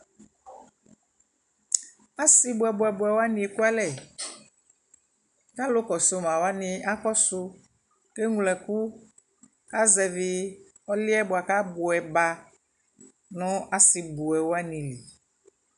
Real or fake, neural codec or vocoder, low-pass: real; none; 10.8 kHz